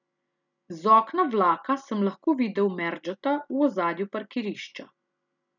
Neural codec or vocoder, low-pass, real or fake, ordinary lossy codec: none; 7.2 kHz; real; none